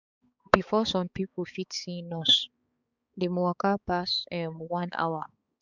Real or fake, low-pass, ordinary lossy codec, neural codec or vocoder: fake; 7.2 kHz; Opus, 64 kbps; codec, 16 kHz, 4 kbps, X-Codec, HuBERT features, trained on balanced general audio